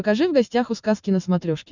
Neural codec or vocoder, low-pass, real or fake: none; 7.2 kHz; real